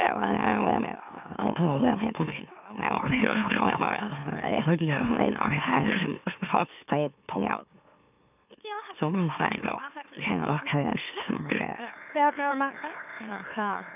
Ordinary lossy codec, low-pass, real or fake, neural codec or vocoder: none; 3.6 kHz; fake; autoencoder, 44.1 kHz, a latent of 192 numbers a frame, MeloTTS